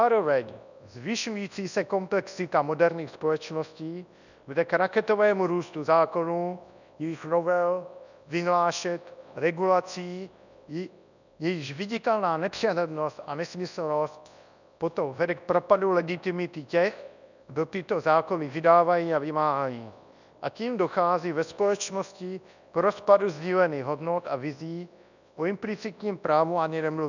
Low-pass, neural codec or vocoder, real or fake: 7.2 kHz; codec, 24 kHz, 0.9 kbps, WavTokenizer, large speech release; fake